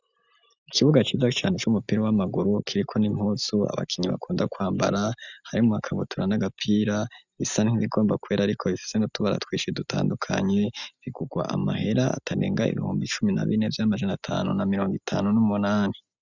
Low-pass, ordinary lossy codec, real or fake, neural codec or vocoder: 7.2 kHz; Opus, 64 kbps; real; none